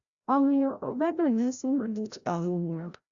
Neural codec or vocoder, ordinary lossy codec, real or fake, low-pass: codec, 16 kHz, 0.5 kbps, FreqCodec, larger model; Opus, 64 kbps; fake; 7.2 kHz